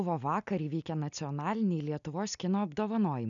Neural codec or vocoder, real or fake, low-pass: none; real; 7.2 kHz